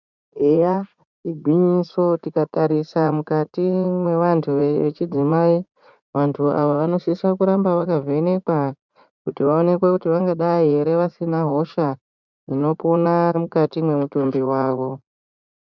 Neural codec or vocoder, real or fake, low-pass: vocoder, 44.1 kHz, 80 mel bands, Vocos; fake; 7.2 kHz